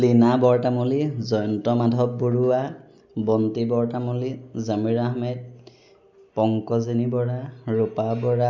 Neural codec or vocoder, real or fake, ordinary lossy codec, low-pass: none; real; none; 7.2 kHz